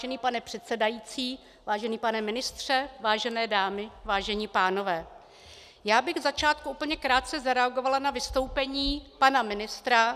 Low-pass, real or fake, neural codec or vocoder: 14.4 kHz; real; none